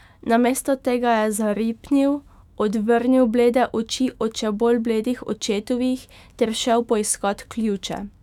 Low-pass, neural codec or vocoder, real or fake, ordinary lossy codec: 19.8 kHz; autoencoder, 48 kHz, 128 numbers a frame, DAC-VAE, trained on Japanese speech; fake; none